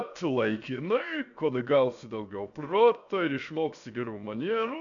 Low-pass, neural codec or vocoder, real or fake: 7.2 kHz; codec, 16 kHz, 0.7 kbps, FocalCodec; fake